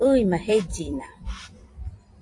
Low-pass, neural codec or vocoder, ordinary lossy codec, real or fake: 10.8 kHz; vocoder, 44.1 kHz, 128 mel bands every 256 samples, BigVGAN v2; AAC, 48 kbps; fake